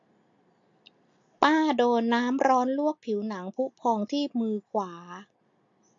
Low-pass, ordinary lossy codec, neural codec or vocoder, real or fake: 7.2 kHz; AAC, 48 kbps; none; real